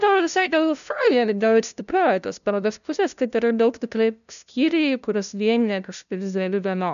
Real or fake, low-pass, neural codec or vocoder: fake; 7.2 kHz; codec, 16 kHz, 0.5 kbps, FunCodec, trained on LibriTTS, 25 frames a second